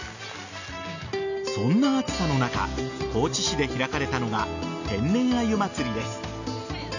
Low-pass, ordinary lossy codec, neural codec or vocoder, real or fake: 7.2 kHz; none; none; real